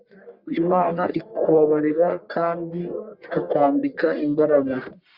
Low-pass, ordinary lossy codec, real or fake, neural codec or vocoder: 5.4 kHz; Opus, 64 kbps; fake; codec, 44.1 kHz, 1.7 kbps, Pupu-Codec